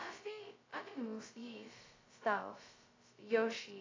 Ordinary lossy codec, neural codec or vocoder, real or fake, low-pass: AAC, 32 kbps; codec, 16 kHz, 0.2 kbps, FocalCodec; fake; 7.2 kHz